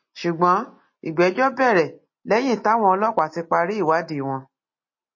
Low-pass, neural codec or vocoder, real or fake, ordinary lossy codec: 7.2 kHz; none; real; MP3, 32 kbps